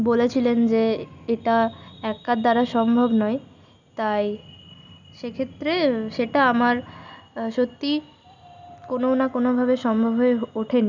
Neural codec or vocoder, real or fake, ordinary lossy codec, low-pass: none; real; none; 7.2 kHz